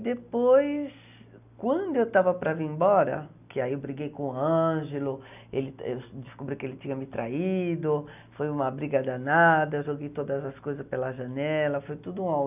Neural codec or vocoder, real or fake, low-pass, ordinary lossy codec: none; real; 3.6 kHz; none